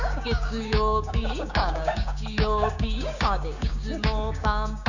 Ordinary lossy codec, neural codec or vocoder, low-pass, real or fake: none; codec, 16 kHz, 6 kbps, DAC; 7.2 kHz; fake